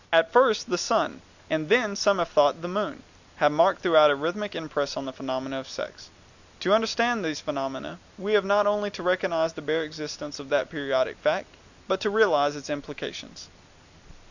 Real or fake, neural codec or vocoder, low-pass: real; none; 7.2 kHz